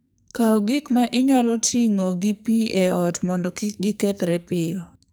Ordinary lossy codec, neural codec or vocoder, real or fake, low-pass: none; codec, 44.1 kHz, 2.6 kbps, SNAC; fake; none